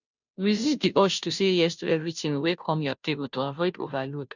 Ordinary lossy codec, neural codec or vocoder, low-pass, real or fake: none; codec, 16 kHz, 0.5 kbps, FunCodec, trained on Chinese and English, 25 frames a second; 7.2 kHz; fake